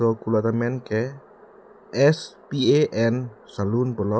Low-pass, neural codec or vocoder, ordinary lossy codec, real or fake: none; none; none; real